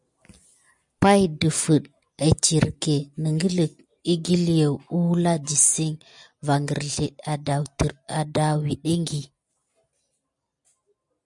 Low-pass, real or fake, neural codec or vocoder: 10.8 kHz; real; none